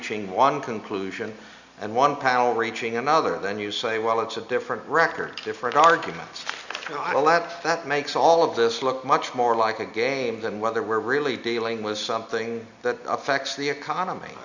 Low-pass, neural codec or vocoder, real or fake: 7.2 kHz; none; real